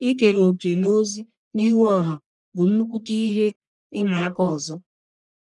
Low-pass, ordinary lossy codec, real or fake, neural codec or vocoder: 10.8 kHz; none; fake; codec, 44.1 kHz, 1.7 kbps, Pupu-Codec